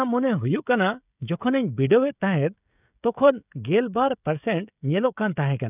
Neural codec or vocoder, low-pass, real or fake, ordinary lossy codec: codec, 16 kHz, 16 kbps, FreqCodec, smaller model; 3.6 kHz; fake; none